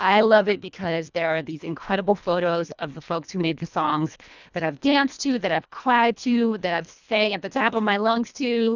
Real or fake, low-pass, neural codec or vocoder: fake; 7.2 kHz; codec, 24 kHz, 1.5 kbps, HILCodec